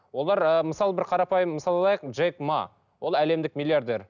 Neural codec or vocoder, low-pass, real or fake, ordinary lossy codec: none; 7.2 kHz; real; none